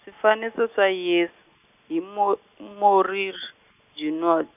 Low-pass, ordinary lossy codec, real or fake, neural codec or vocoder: 3.6 kHz; none; real; none